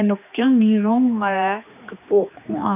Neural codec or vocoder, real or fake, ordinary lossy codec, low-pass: codec, 16 kHz, 2 kbps, X-Codec, HuBERT features, trained on general audio; fake; none; 3.6 kHz